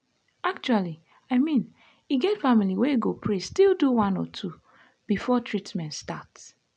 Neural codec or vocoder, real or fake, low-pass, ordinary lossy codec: none; real; 9.9 kHz; none